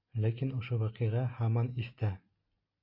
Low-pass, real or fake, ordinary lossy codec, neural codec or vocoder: 5.4 kHz; real; MP3, 32 kbps; none